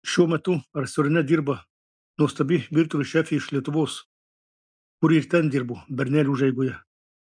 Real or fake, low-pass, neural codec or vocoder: real; 9.9 kHz; none